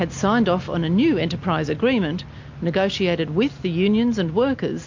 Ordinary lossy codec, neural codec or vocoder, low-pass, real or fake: MP3, 48 kbps; none; 7.2 kHz; real